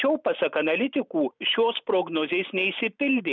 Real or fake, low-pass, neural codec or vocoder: real; 7.2 kHz; none